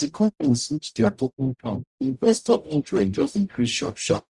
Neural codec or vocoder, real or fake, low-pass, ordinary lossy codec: codec, 44.1 kHz, 0.9 kbps, DAC; fake; 10.8 kHz; Opus, 64 kbps